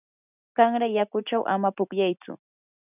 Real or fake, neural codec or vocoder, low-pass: real; none; 3.6 kHz